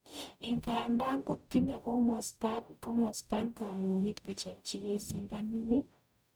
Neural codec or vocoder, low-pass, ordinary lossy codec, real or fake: codec, 44.1 kHz, 0.9 kbps, DAC; none; none; fake